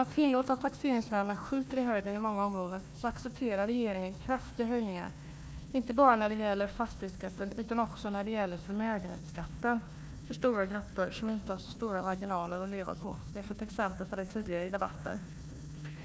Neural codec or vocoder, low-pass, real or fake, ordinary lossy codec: codec, 16 kHz, 1 kbps, FunCodec, trained on Chinese and English, 50 frames a second; none; fake; none